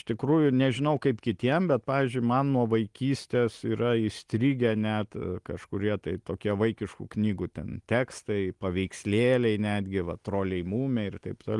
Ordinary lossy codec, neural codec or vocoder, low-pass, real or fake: Opus, 24 kbps; none; 10.8 kHz; real